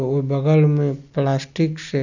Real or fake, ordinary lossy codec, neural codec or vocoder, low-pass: real; none; none; 7.2 kHz